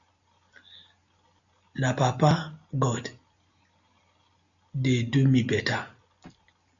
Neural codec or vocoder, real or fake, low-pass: none; real; 7.2 kHz